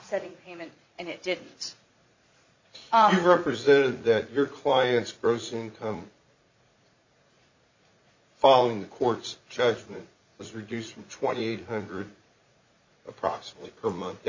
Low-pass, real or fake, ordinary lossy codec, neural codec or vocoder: 7.2 kHz; fake; MP3, 64 kbps; vocoder, 44.1 kHz, 80 mel bands, Vocos